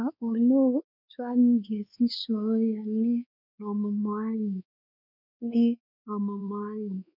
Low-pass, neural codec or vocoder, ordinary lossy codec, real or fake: 5.4 kHz; codec, 16 kHz, 2 kbps, X-Codec, WavLM features, trained on Multilingual LibriSpeech; none; fake